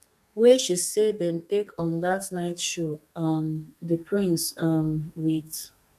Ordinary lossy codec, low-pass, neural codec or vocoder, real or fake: none; 14.4 kHz; codec, 32 kHz, 1.9 kbps, SNAC; fake